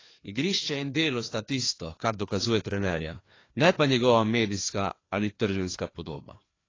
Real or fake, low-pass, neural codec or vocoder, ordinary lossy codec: fake; 7.2 kHz; codec, 16 kHz, 2 kbps, FreqCodec, larger model; AAC, 32 kbps